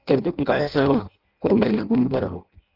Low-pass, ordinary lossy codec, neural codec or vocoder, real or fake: 5.4 kHz; Opus, 32 kbps; codec, 16 kHz in and 24 kHz out, 0.6 kbps, FireRedTTS-2 codec; fake